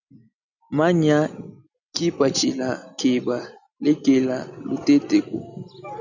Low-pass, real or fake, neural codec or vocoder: 7.2 kHz; real; none